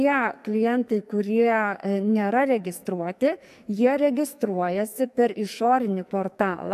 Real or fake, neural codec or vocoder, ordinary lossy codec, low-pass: fake; codec, 44.1 kHz, 2.6 kbps, SNAC; AAC, 96 kbps; 14.4 kHz